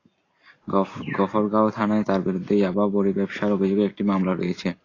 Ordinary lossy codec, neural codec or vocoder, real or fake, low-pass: AAC, 32 kbps; none; real; 7.2 kHz